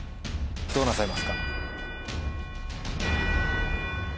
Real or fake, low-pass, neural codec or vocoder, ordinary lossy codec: real; none; none; none